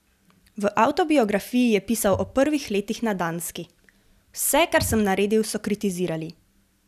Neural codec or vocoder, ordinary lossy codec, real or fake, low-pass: none; none; real; 14.4 kHz